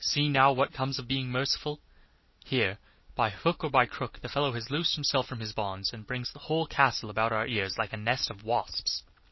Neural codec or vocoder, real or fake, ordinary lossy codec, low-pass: none; real; MP3, 24 kbps; 7.2 kHz